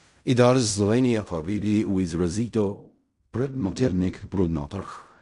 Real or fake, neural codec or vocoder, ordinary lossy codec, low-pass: fake; codec, 16 kHz in and 24 kHz out, 0.4 kbps, LongCat-Audio-Codec, fine tuned four codebook decoder; none; 10.8 kHz